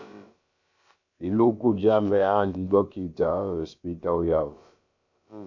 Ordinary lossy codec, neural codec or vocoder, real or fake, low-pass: MP3, 64 kbps; codec, 16 kHz, about 1 kbps, DyCAST, with the encoder's durations; fake; 7.2 kHz